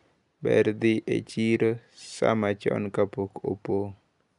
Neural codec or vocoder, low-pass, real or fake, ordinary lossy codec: none; 10.8 kHz; real; none